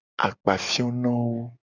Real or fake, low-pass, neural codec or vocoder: real; 7.2 kHz; none